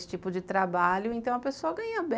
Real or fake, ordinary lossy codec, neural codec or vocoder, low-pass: real; none; none; none